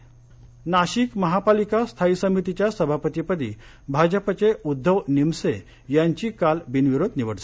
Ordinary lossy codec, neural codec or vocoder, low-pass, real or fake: none; none; none; real